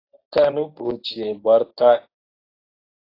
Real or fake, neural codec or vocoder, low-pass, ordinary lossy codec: fake; codec, 24 kHz, 0.9 kbps, WavTokenizer, medium speech release version 1; 5.4 kHz; AAC, 32 kbps